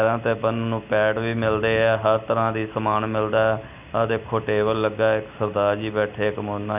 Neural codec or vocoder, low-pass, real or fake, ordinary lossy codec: none; 3.6 kHz; real; none